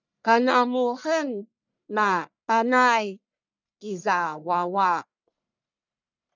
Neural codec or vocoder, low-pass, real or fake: codec, 44.1 kHz, 1.7 kbps, Pupu-Codec; 7.2 kHz; fake